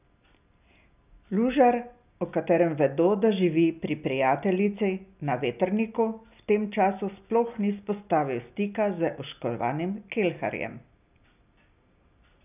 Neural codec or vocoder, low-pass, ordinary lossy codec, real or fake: none; 3.6 kHz; none; real